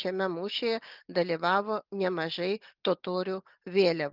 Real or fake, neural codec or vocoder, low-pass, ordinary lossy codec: real; none; 5.4 kHz; Opus, 24 kbps